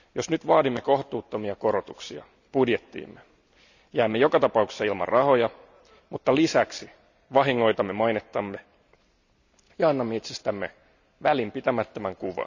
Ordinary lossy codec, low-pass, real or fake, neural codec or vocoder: none; 7.2 kHz; real; none